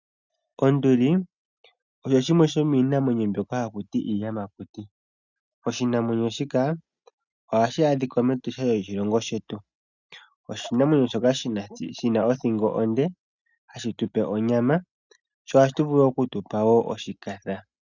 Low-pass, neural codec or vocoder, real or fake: 7.2 kHz; none; real